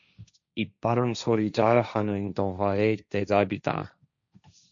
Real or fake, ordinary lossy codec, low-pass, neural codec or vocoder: fake; MP3, 64 kbps; 7.2 kHz; codec, 16 kHz, 1.1 kbps, Voila-Tokenizer